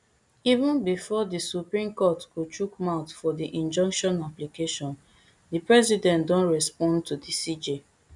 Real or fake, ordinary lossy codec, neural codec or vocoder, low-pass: real; none; none; 10.8 kHz